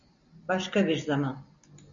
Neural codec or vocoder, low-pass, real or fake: none; 7.2 kHz; real